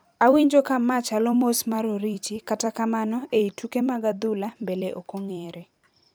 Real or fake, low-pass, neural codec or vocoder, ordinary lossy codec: fake; none; vocoder, 44.1 kHz, 128 mel bands every 256 samples, BigVGAN v2; none